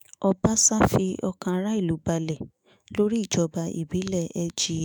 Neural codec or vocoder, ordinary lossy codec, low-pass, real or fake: autoencoder, 48 kHz, 128 numbers a frame, DAC-VAE, trained on Japanese speech; none; none; fake